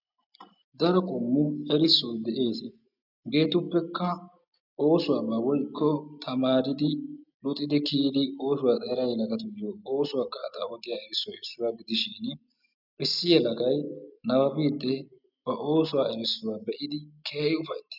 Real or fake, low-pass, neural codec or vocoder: real; 5.4 kHz; none